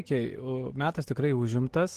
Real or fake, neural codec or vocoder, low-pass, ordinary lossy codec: real; none; 14.4 kHz; Opus, 16 kbps